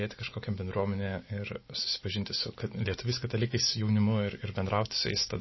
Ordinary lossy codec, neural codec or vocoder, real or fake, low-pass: MP3, 24 kbps; none; real; 7.2 kHz